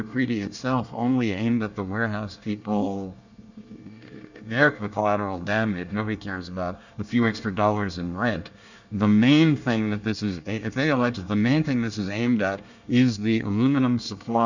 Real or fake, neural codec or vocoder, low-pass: fake; codec, 24 kHz, 1 kbps, SNAC; 7.2 kHz